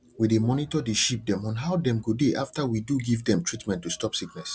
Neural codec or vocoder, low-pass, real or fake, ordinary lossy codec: none; none; real; none